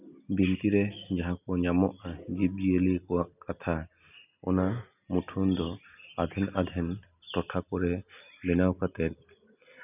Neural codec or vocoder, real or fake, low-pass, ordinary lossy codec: none; real; 3.6 kHz; none